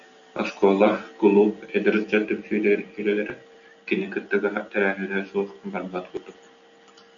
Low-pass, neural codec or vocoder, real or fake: 7.2 kHz; none; real